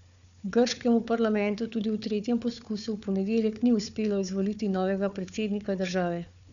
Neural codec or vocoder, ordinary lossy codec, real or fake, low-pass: codec, 16 kHz, 4 kbps, FunCodec, trained on Chinese and English, 50 frames a second; none; fake; 7.2 kHz